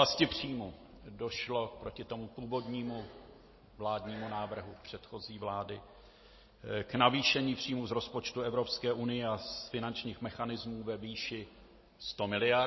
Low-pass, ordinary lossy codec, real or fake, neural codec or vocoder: 7.2 kHz; MP3, 24 kbps; real; none